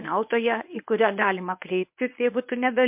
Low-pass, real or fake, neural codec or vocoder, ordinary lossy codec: 3.6 kHz; fake; codec, 24 kHz, 0.9 kbps, WavTokenizer, medium speech release version 1; MP3, 32 kbps